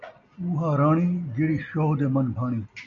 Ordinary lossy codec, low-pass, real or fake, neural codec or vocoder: MP3, 96 kbps; 7.2 kHz; real; none